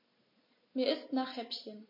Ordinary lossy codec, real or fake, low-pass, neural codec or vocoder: MP3, 24 kbps; real; 5.4 kHz; none